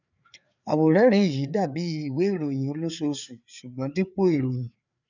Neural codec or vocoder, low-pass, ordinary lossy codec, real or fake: codec, 16 kHz, 4 kbps, FreqCodec, larger model; 7.2 kHz; none; fake